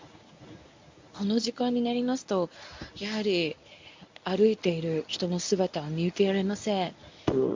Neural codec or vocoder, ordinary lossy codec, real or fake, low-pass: codec, 24 kHz, 0.9 kbps, WavTokenizer, medium speech release version 1; MP3, 64 kbps; fake; 7.2 kHz